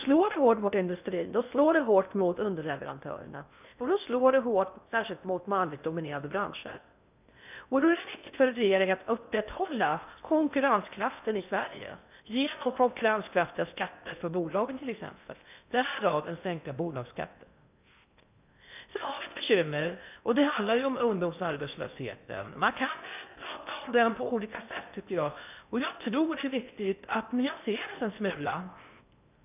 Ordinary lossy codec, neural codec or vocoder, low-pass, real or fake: none; codec, 16 kHz in and 24 kHz out, 0.6 kbps, FocalCodec, streaming, 2048 codes; 3.6 kHz; fake